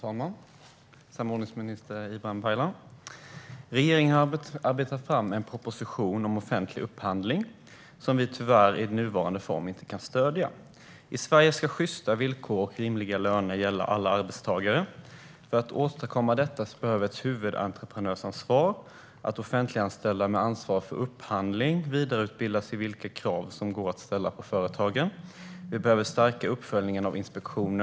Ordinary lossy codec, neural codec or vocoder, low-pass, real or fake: none; none; none; real